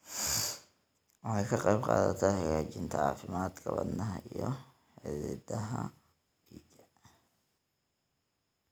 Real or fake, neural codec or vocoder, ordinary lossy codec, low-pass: real; none; none; none